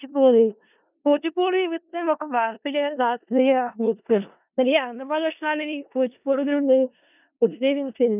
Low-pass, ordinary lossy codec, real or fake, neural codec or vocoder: 3.6 kHz; none; fake; codec, 16 kHz in and 24 kHz out, 0.4 kbps, LongCat-Audio-Codec, four codebook decoder